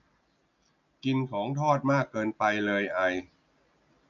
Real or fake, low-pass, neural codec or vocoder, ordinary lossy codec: real; 7.2 kHz; none; none